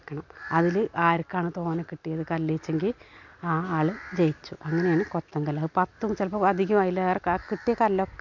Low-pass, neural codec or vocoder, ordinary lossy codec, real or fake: 7.2 kHz; none; MP3, 48 kbps; real